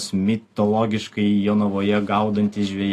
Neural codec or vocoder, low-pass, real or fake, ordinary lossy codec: none; 14.4 kHz; real; AAC, 48 kbps